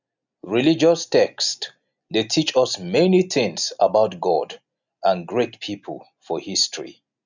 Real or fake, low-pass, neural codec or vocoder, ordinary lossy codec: real; 7.2 kHz; none; none